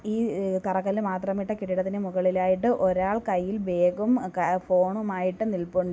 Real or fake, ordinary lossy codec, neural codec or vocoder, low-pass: real; none; none; none